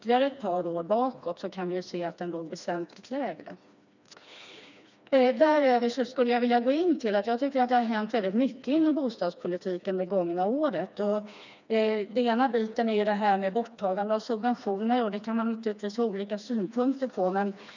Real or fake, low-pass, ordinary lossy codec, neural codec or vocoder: fake; 7.2 kHz; none; codec, 16 kHz, 2 kbps, FreqCodec, smaller model